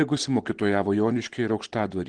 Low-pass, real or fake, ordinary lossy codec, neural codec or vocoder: 9.9 kHz; real; Opus, 16 kbps; none